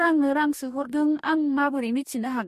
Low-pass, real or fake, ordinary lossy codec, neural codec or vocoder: 14.4 kHz; fake; MP3, 64 kbps; codec, 44.1 kHz, 2.6 kbps, DAC